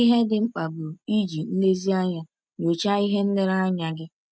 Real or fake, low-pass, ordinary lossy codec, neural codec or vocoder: real; none; none; none